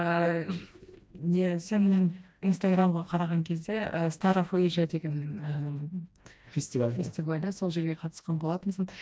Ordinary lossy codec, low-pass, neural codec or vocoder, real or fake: none; none; codec, 16 kHz, 1 kbps, FreqCodec, smaller model; fake